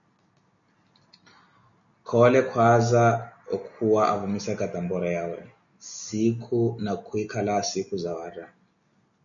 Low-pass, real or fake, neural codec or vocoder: 7.2 kHz; real; none